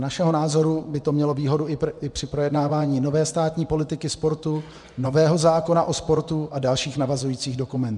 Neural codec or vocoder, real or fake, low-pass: vocoder, 24 kHz, 100 mel bands, Vocos; fake; 10.8 kHz